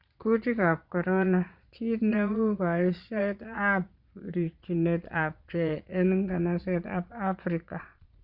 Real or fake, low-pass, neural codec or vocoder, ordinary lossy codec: fake; 5.4 kHz; vocoder, 22.05 kHz, 80 mel bands, Vocos; Opus, 64 kbps